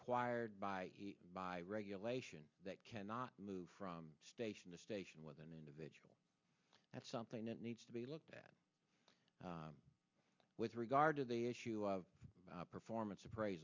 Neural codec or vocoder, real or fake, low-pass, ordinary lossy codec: none; real; 7.2 kHz; MP3, 64 kbps